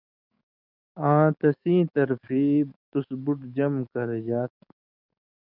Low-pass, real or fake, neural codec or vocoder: 5.4 kHz; fake; codec, 44.1 kHz, 7.8 kbps, DAC